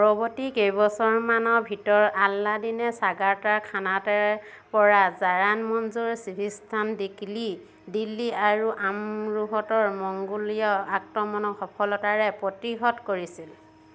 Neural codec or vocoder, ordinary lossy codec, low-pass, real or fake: none; none; none; real